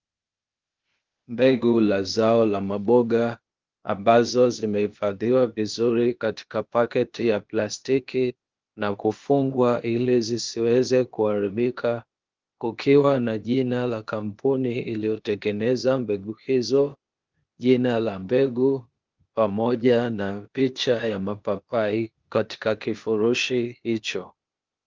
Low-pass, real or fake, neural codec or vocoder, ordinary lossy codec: 7.2 kHz; fake; codec, 16 kHz, 0.8 kbps, ZipCodec; Opus, 24 kbps